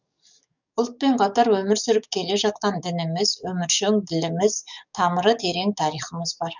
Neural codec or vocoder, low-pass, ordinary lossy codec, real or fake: codec, 44.1 kHz, 7.8 kbps, DAC; 7.2 kHz; none; fake